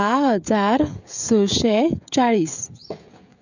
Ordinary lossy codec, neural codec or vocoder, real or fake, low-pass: none; codec, 16 kHz, 16 kbps, FreqCodec, smaller model; fake; 7.2 kHz